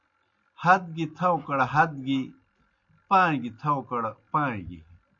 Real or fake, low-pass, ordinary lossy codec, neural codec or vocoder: real; 7.2 kHz; MP3, 48 kbps; none